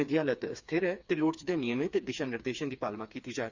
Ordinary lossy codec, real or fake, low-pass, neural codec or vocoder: Opus, 64 kbps; fake; 7.2 kHz; codec, 16 kHz, 4 kbps, FreqCodec, smaller model